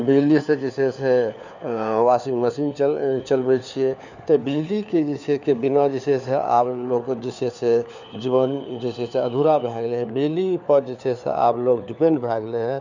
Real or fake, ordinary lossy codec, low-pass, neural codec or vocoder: fake; none; 7.2 kHz; codec, 16 kHz, 4 kbps, FunCodec, trained on LibriTTS, 50 frames a second